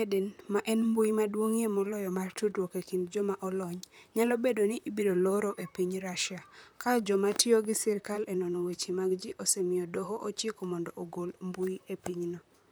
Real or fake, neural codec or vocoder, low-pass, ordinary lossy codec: fake; vocoder, 44.1 kHz, 128 mel bands, Pupu-Vocoder; none; none